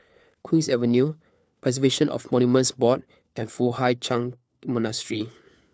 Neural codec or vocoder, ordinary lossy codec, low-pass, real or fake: codec, 16 kHz, 4 kbps, FunCodec, trained on LibriTTS, 50 frames a second; none; none; fake